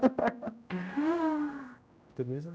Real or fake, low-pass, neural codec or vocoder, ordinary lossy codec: fake; none; codec, 16 kHz, 0.5 kbps, X-Codec, HuBERT features, trained on general audio; none